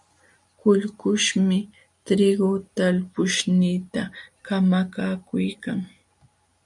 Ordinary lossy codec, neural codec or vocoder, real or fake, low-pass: MP3, 64 kbps; none; real; 10.8 kHz